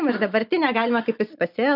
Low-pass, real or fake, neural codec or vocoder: 5.4 kHz; real; none